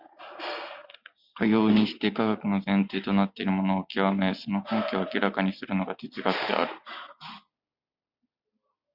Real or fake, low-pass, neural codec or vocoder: real; 5.4 kHz; none